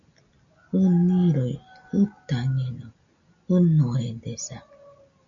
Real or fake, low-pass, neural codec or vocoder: real; 7.2 kHz; none